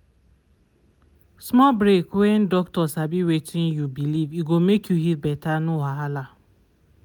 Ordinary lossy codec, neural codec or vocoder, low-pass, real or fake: none; none; 19.8 kHz; real